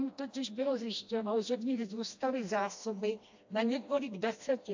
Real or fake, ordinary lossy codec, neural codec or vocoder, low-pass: fake; AAC, 48 kbps; codec, 16 kHz, 1 kbps, FreqCodec, smaller model; 7.2 kHz